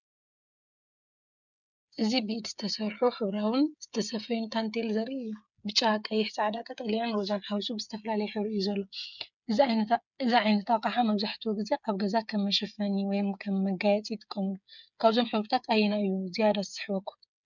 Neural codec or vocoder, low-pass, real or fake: codec, 16 kHz, 8 kbps, FreqCodec, smaller model; 7.2 kHz; fake